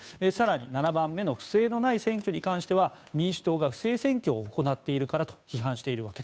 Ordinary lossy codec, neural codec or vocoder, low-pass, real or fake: none; codec, 16 kHz, 2 kbps, FunCodec, trained on Chinese and English, 25 frames a second; none; fake